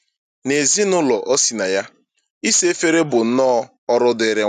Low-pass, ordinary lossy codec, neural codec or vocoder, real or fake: 14.4 kHz; none; none; real